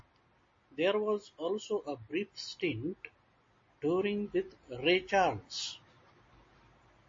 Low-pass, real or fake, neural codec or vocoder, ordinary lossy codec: 7.2 kHz; real; none; MP3, 32 kbps